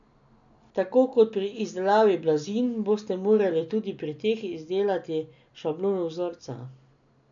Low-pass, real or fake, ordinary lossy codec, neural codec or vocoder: 7.2 kHz; real; none; none